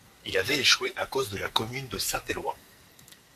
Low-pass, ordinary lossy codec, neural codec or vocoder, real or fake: 14.4 kHz; MP3, 64 kbps; codec, 44.1 kHz, 2.6 kbps, SNAC; fake